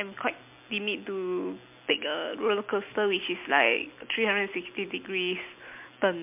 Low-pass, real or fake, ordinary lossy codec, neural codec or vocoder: 3.6 kHz; real; MP3, 32 kbps; none